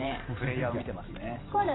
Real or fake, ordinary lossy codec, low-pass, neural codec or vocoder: fake; AAC, 16 kbps; 7.2 kHz; vocoder, 44.1 kHz, 128 mel bands every 512 samples, BigVGAN v2